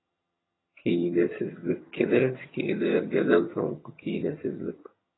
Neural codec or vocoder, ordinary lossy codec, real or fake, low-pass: vocoder, 22.05 kHz, 80 mel bands, HiFi-GAN; AAC, 16 kbps; fake; 7.2 kHz